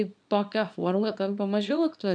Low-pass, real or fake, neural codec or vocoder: 9.9 kHz; fake; codec, 24 kHz, 0.9 kbps, WavTokenizer, small release